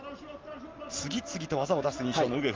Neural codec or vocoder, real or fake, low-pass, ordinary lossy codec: none; real; 7.2 kHz; Opus, 32 kbps